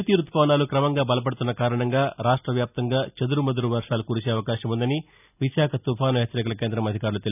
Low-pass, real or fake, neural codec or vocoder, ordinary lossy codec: 3.6 kHz; real; none; none